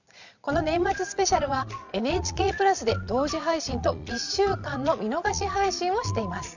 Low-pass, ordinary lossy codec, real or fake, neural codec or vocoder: 7.2 kHz; none; fake; vocoder, 22.05 kHz, 80 mel bands, Vocos